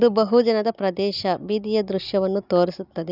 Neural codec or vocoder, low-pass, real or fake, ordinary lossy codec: none; 5.4 kHz; real; none